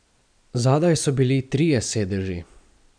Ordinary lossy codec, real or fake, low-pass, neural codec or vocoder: none; real; 9.9 kHz; none